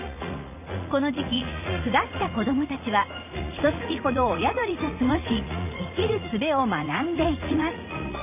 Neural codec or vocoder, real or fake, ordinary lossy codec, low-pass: vocoder, 44.1 kHz, 80 mel bands, Vocos; fake; none; 3.6 kHz